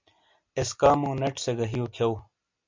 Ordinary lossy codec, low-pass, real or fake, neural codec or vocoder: MP3, 64 kbps; 7.2 kHz; real; none